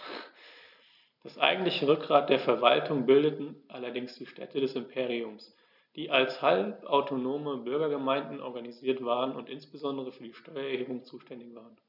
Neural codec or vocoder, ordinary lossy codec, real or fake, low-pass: none; none; real; 5.4 kHz